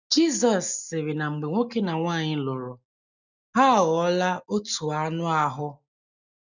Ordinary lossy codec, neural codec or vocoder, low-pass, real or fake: none; none; 7.2 kHz; real